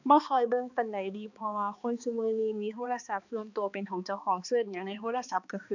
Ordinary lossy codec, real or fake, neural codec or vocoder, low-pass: none; fake; codec, 16 kHz, 2 kbps, X-Codec, HuBERT features, trained on balanced general audio; 7.2 kHz